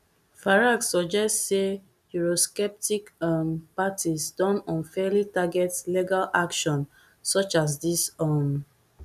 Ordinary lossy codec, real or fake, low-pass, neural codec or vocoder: none; real; 14.4 kHz; none